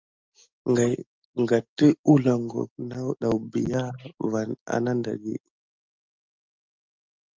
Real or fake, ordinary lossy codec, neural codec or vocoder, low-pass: real; Opus, 24 kbps; none; 7.2 kHz